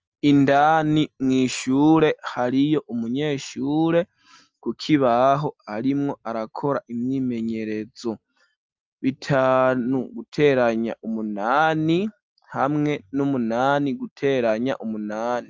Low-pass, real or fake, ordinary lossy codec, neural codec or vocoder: 7.2 kHz; real; Opus, 24 kbps; none